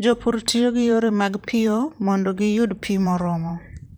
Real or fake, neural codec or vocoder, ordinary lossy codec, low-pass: fake; vocoder, 44.1 kHz, 128 mel bands, Pupu-Vocoder; none; none